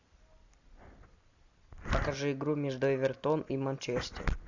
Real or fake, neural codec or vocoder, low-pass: real; none; 7.2 kHz